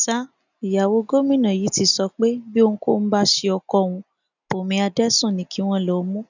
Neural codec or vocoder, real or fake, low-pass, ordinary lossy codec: none; real; 7.2 kHz; none